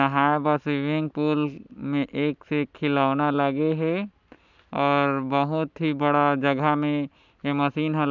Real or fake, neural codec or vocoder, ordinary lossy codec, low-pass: real; none; none; 7.2 kHz